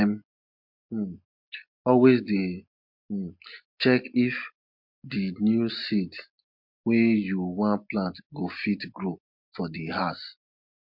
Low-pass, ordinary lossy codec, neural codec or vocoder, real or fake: 5.4 kHz; MP3, 48 kbps; none; real